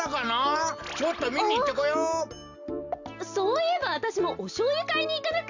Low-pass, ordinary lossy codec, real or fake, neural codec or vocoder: 7.2 kHz; Opus, 64 kbps; real; none